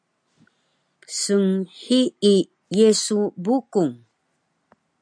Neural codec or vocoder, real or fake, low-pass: none; real; 9.9 kHz